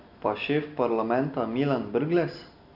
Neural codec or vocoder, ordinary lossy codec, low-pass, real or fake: none; none; 5.4 kHz; real